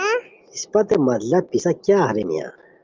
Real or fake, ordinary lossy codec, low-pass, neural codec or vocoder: real; Opus, 24 kbps; 7.2 kHz; none